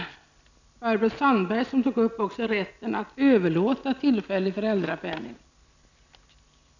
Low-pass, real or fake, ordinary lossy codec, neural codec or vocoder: 7.2 kHz; real; none; none